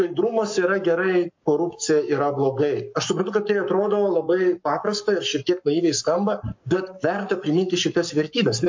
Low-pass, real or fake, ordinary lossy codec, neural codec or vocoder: 7.2 kHz; fake; MP3, 48 kbps; codec, 44.1 kHz, 7.8 kbps, Pupu-Codec